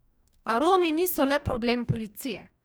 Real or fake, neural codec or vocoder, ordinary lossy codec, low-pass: fake; codec, 44.1 kHz, 2.6 kbps, DAC; none; none